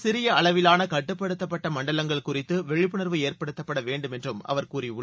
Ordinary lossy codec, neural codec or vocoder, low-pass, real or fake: none; none; none; real